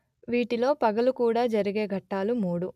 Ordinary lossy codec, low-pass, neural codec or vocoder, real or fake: none; 14.4 kHz; none; real